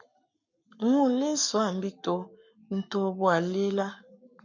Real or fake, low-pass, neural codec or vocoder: fake; 7.2 kHz; codec, 44.1 kHz, 7.8 kbps, Pupu-Codec